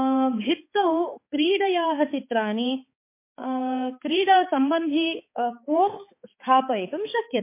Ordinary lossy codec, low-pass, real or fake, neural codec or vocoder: MP3, 24 kbps; 3.6 kHz; fake; codec, 16 kHz, 4 kbps, X-Codec, HuBERT features, trained on balanced general audio